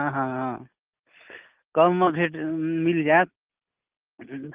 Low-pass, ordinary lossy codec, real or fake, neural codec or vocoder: 3.6 kHz; Opus, 32 kbps; real; none